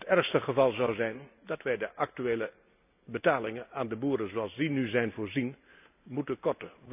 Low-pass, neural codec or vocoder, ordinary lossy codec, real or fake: 3.6 kHz; none; none; real